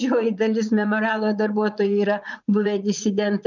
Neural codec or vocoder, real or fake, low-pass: vocoder, 44.1 kHz, 80 mel bands, Vocos; fake; 7.2 kHz